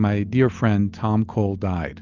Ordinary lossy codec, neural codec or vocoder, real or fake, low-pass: Opus, 24 kbps; none; real; 7.2 kHz